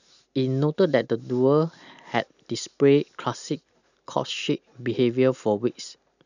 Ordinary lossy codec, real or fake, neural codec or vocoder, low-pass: none; real; none; 7.2 kHz